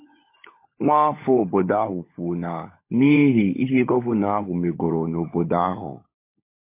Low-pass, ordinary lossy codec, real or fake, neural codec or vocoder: 3.6 kHz; MP3, 24 kbps; fake; codec, 16 kHz, 16 kbps, FunCodec, trained on LibriTTS, 50 frames a second